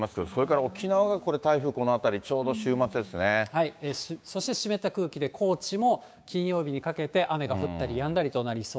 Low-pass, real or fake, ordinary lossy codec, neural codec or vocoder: none; fake; none; codec, 16 kHz, 6 kbps, DAC